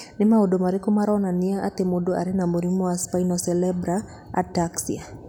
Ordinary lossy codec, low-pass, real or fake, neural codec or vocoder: none; 19.8 kHz; real; none